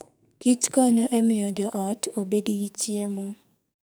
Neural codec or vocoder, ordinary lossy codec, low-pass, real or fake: codec, 44.1 kHz, 2.6 kbps, SNAC; none; none; fake